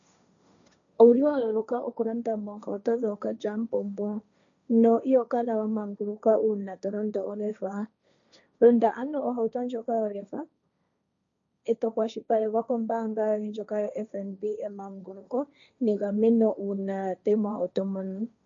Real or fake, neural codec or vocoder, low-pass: fake; codec, 16 kHz, 1.1 kbps, Voila-Tokenizer; 7.2 kHz